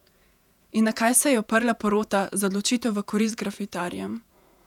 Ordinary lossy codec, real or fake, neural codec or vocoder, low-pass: none; fake; vocoder, 48 kHz, 128 mel bands, Vocos; 19.8 kHz